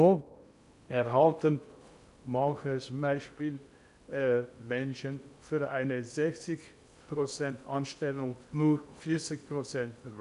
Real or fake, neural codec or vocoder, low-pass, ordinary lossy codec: fake; codec, 16 kHz in and 24 kHz out, 0.6 kbps, FocalCodec, streaming, 2048 codes; 10.8 kHz; none